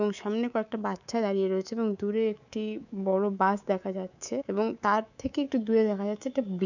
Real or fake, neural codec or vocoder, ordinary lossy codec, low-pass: fake; codec, 24 kHz, 3.1 kbps, DualCodec; none; 7.2 kHz